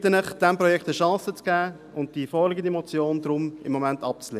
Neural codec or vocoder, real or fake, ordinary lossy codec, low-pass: none; real; none; 14.4 kHz